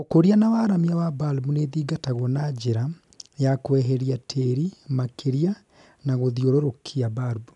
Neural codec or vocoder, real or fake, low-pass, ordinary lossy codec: none; real; 10.8 kHz; none